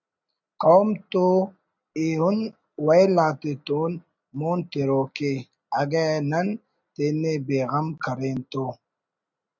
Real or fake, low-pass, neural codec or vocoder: real; 7.2 kHz; none